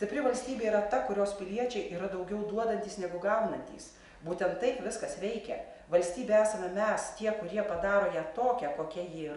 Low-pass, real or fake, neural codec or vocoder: 10.8 kHz; real; none